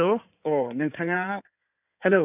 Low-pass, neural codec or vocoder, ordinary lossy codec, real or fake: 3.6 kHz; codec, 16 kHz in and 24 kHz out, 2.2 kbps, FireRedTTS-2 codec; none; fake